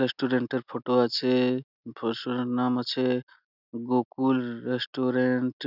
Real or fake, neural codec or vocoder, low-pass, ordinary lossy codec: real; none; 5.4 kHz; none